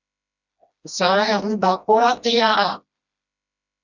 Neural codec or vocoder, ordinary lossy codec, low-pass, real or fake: codec, 16 kHz, 1 kbps, FreqCodec, smaller model; Opus, 64 kbps; 7.2 kHz; fake